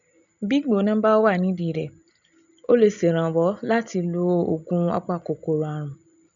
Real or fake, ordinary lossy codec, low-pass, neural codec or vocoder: real; none; 7.2 kHz; none